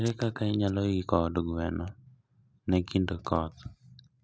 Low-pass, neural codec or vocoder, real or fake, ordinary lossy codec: none; none; real; none